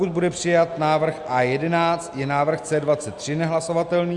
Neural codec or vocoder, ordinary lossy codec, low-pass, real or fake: none; Opus, 64 kbps; 10.8 kHz; real